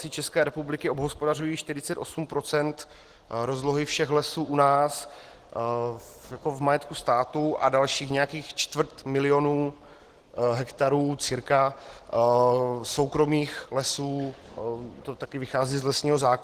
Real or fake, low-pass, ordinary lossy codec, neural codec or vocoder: real; 14.4 kHz; Opus, 16 kbps; none